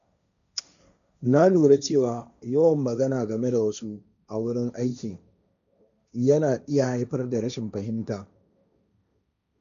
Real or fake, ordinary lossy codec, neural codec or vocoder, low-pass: fake; none; codec, 16 kHz, 1.1 kbps, Voila-Tokenizer; 7.2 kHz